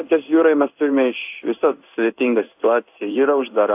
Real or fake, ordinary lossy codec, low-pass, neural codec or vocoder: fake; AAC, 32 kbps; 3.6 kHz; codec, 16 kHz in and 24 kHz out, 1 kbps, XY-Tokenizer